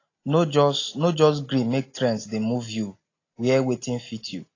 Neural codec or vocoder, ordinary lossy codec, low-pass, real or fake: none; AAC, 32 kbps; 7.2 kHz; real